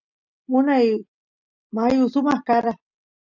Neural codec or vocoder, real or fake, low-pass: none; real; 7.2 kHz